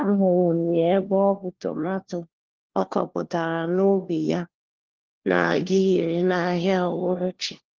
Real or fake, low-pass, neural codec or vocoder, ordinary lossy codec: fake; 7.2 kHz; codec, 16 kHz, 1 kbps, FunCodec, trained on LibriTTS, 50 frames a second; Opus, 16 kbps